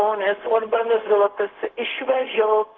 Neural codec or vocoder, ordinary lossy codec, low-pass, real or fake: codec, 16 kHz, 0.4 kbps, LongCat-Audio-Codec; Opus, 32 kbps; 7.2 kHz; fake